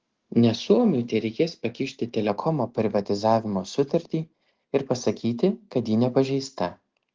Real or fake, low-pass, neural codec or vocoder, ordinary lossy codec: real; 7.2 kHz; none; Opus, 16 kbps